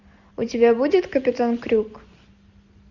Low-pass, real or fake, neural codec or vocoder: 7.2 kHz; real; none